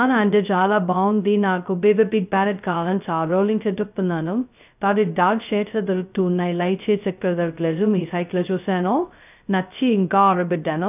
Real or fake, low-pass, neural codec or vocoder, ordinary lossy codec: fake; 3.6 kHz; codec, 16 kHz, 0.2 kbps, FocalCodec; none